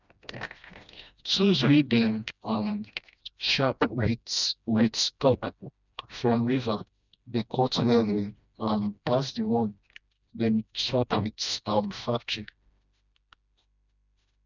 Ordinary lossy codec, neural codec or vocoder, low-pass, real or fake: none; codec, 16 kHz, 1 kbps, FreqCodec, smaller model; 7.2 kHz; fake